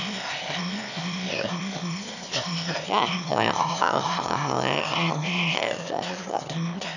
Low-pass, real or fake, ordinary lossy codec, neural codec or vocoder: 7.2 kHz; fake; none; autoencoder, 22.05 kHz, a latent of 192 numbers a frame, VITS, trained on one speaker